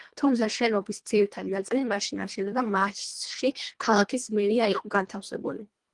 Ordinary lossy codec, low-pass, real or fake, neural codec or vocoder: Opus, 24 kbps; 10.8 kHz; fake; codec, 24 kHz, 1.5 kbps, HILCodec